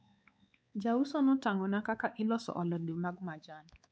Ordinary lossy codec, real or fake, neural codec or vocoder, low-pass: none; fake; codec, 16 kHz, 4 kbps, X-Codec, WavLM features, trained on Multilingual LibriSpeech; none